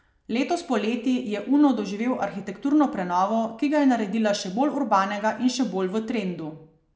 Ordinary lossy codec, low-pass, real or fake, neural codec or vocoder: none; none; real; none